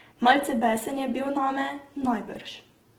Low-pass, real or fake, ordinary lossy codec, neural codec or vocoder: 19.8 kHz; fake; Opus, 16 kbps; vocoder, 48 kHz, 128 mel bands, Vocos